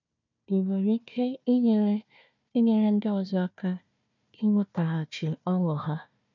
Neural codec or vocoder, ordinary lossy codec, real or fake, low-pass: codec, 16 kHz, 1 kbps, FunCodec, trained on Chinese and English, 50 frames a second; none; fake; 7.2 kHz